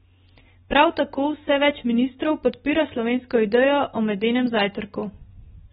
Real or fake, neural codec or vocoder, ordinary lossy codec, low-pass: real; none; AAC, 16 kbps; 19.8 kHz